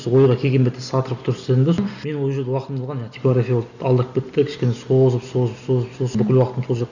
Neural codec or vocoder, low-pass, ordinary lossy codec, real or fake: none; 7.2 kHz; none; real